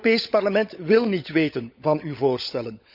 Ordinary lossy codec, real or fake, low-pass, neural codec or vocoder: none; fake; 5.4 kHz; codec, 16 kHz, 16 kbps, FunCodec, trained on LibriTTS, 50 frames a second